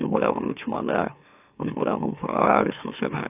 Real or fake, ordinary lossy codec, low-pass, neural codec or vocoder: fake; AAC, 32 kbps; 3.6 kHz; autoencoder, 44.1 kHz, a latent of 192 numbers a frame, MeloTTS